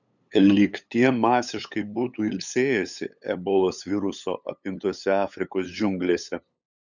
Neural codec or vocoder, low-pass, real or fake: codec, 16 kHz, 8 kbps, FunCodec, trained on LibriTTS, 25 frames a second; 7.2 kHz; fake